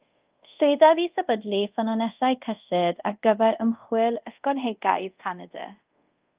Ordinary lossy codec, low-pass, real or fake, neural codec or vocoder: Opus, 32 kbps; 3.6 kHz; fake; codec, 24 kHz, 0.5 kbps, DualCodec